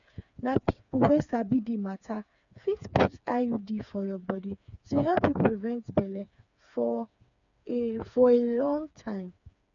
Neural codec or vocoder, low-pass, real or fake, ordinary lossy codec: codec, 16 kHz, 4 kbps, FreqCodec, smaller model; 7.2 kHz; fake; none